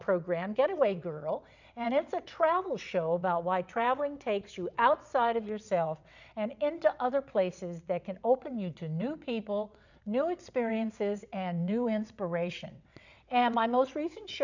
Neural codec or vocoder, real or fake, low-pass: vocoder, 22.05 kHz, 80 mel bands, WaveNeXt; fake; 7.2 kHz